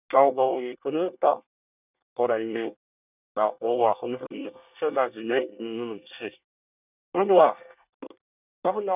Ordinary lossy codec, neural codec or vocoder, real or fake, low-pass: none; codec, 24 kHz, 1 kbps, SNAC; fake; 3.6 kHz